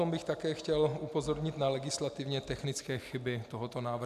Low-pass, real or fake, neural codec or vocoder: 14.4 kHz; real; none